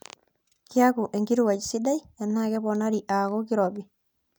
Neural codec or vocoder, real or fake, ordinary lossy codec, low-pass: none; real; none; none